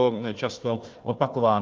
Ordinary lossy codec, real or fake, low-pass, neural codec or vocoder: Opus, 32 kbps; fake; 7.2 kHz; codec, 16 kHz, 1 kbps, FunCodec, trained on Chinese and English, 50 frames a second